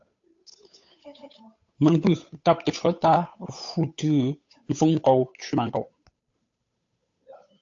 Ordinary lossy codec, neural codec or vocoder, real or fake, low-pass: AAC, 48 kbps; codec, 16 kHz, 8 kbps, FunCodec, trained on Chinese and English, 25 frames a second; fake; 7.2 kHz